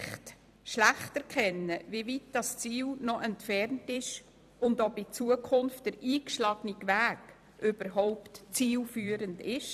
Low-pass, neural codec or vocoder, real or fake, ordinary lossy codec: 14.4 kHz; vocoder, 44.1 kHz, 128 mel bands every 256 samples, BigVGAN v2; fake; none